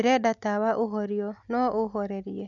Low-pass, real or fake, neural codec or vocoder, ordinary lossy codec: 7.2 kHz; real; none; none